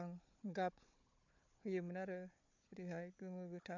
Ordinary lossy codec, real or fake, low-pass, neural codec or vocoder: MP3, 48 kbps; fake; 7.2 kHz; codec, 16 kHz, 4 kbps, FunCodec, trained on Chinese and English, 50 frames a second